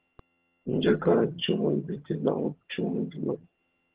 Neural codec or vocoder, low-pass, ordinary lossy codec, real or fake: vocoder, 22.05 kHz, 80 mel bands, HiFi-GAN; 3.6 kHz; Opus, 16 kbps; fake